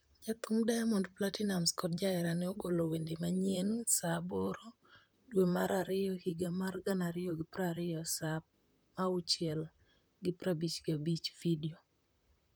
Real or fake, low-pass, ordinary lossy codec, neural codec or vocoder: fake; none; none; vocoder, 44.1 kHz, 128 mel bands, Pupu-Vocoder